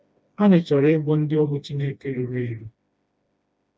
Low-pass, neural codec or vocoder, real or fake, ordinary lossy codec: none; codec, 16 kHz, 1 kbps, FreqCodec, smaller model; fake; none